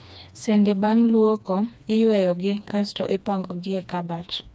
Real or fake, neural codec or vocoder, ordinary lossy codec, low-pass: fake; codec, 16 kHz, 2 kbps, FreqCodec, smaller model; none; none